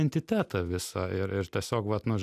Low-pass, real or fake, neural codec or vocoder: 14.4 kHz; real; none